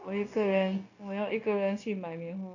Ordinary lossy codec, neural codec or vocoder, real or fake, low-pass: none; codec, 16 kHz, 0.9 kbps, LongCat-Audio-Codec; fake; 7.2 kHz